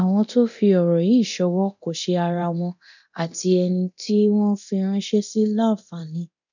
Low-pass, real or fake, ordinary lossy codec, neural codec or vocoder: 7.2 kHz; fake; none; codec, 24 kHz, 0.9 kbps, DualCodec